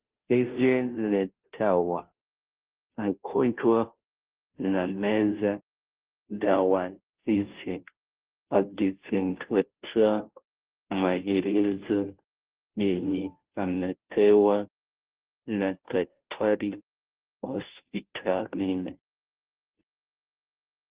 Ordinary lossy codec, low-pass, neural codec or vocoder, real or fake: Opus, 16 kbps; 3.6 kHz; codec, 16 kHz, 0.5 kbps, FunCodec, trained on Chinese and English, 25 frames a second; fake